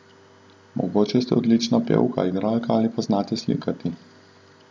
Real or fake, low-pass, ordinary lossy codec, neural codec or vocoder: real; none; none; none